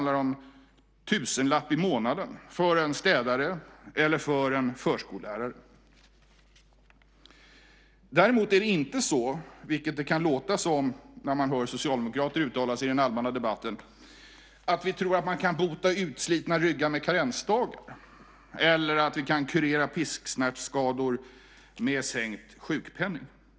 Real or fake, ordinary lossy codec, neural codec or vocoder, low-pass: real; none; none; none